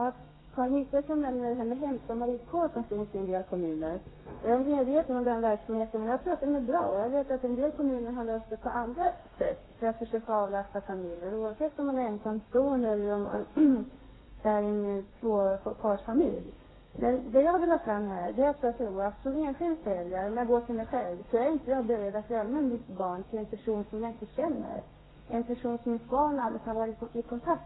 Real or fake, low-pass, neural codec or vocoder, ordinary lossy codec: fake; 7.2 kHz; codec, 32 kHz, 1.9 kbps, SNAC; AAC, 16 kbps